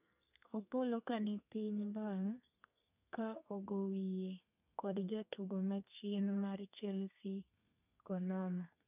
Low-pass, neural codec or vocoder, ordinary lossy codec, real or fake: 3.6 kHz; codec, 32 kHz, 1.9 kbps, SNAC; none; fake